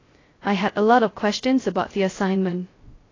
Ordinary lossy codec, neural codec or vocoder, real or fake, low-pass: AAC, 32 kbps; codec, 16 kHz, 0.2 kbps, FocalCodec; fake; 7.2 kHz